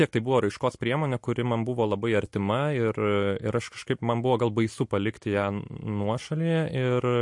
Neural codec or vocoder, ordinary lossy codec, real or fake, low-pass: none; MP3, 48 kbps; real; 10.8 kHz